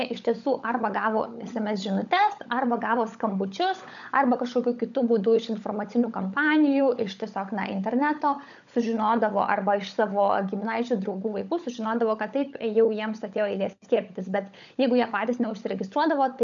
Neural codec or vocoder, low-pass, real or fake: codec, 16 kHz, 16 kbps, FunCodec, trained on LibriTTS, 50 frames a second; 7.2 kHz; fake